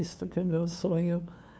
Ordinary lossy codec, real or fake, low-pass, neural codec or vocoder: none; fake; none; codec, 16 kHz, 2 kbps, FunCodec, trained on LibriTTS, 25 frames a second